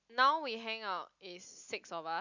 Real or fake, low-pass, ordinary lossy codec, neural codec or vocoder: real; 7.2 kHz; none; none